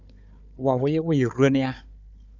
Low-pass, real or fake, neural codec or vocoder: 7.2 kHz; fake; codec, 16 kHz, 4 kbps, FunCodec, trained on Chinese and English, 50 frames a second